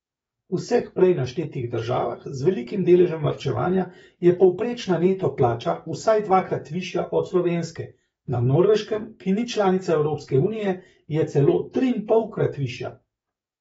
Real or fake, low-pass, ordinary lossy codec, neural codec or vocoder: fake; 19.8 kHz; AAC, 24 kbps; codec, 44.1 kHz, 7.8 kbps, DAC